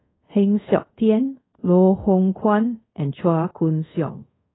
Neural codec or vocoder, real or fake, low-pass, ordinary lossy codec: codec, 24 kHz, 0.5 kbps, DualCodec; fake; 7.2 kHz; AAC, 16 kbps